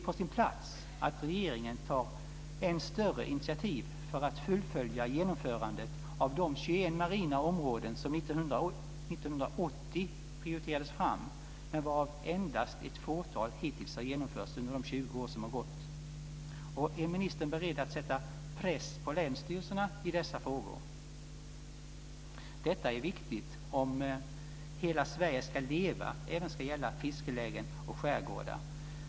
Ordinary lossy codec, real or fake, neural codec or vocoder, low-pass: none; real; none; none